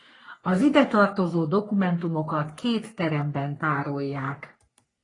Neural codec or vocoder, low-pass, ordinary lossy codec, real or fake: codec, 44.1 kHz, 3.4 kbps, Pupu-Codec; 10.8 kHz; AAC, 32 kbps; fake